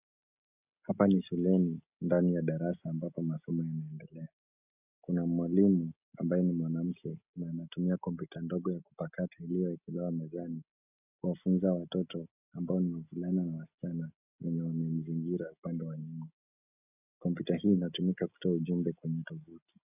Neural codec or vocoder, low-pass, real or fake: none; 3.6 kHz; real